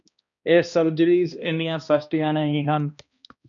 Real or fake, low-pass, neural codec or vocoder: fake; 7.2 kHz; codec, 16 kHz, 1 kbps, X-Codec, HuBERT features, trained on balanced general audio